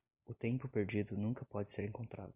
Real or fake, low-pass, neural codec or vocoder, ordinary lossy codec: real; 3.6 kHz; none; Opus, 64 kbps